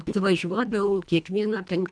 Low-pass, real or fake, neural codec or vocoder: 9.9 kHz; fake; codec, 24 kHz, 1.5 kbps, HILCodec